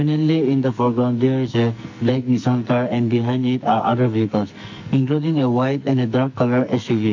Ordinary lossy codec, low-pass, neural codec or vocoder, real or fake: MP3, 48 kbps; 7.2 kHz; codec, 44.1 kHz, 2.6 kbps, SNAC; fake